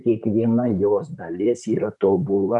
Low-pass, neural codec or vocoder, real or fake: 10.8 kHz; vocoder, 44.1 kHz, 128 mel bands, Pupu-Vocoder; fake